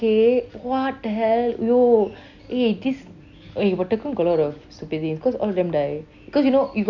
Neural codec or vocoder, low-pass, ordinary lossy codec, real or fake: none; 7.2 kHz; none; real